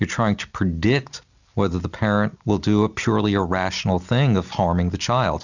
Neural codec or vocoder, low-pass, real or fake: none; 7.2 kHz; real